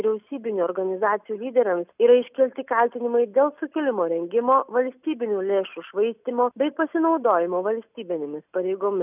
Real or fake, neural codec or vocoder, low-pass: real; none; 3.6 kHz